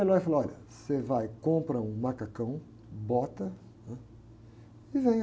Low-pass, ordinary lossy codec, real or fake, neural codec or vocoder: none; none; real; none